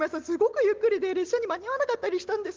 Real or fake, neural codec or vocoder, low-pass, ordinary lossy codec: real; none; 7.2 kHz; Opus, 16 kbps